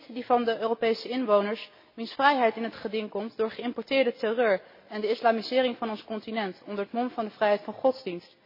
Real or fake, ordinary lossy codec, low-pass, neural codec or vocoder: real; MP3, 24 kbps; 5.4 kHz; none